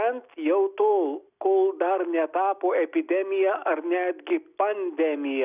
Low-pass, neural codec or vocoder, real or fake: 3.6 kHz; none; real